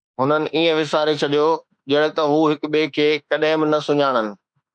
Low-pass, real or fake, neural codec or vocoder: 9.9 kHz; fake; autoencoder, 48 kHz, 32 numbers a frame, DAC-VAE, trained on Japanese speech